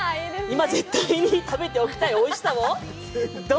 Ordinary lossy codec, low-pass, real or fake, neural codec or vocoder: none; none; real; none